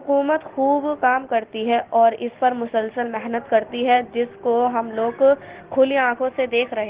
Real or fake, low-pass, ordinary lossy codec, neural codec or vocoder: real; 3.6 kHz; Opus, 16 kbps; none